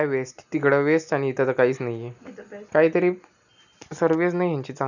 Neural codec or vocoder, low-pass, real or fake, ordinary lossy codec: none; 7.2 kHz; real; none